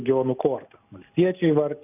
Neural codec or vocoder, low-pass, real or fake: none; 3.6 kHz; real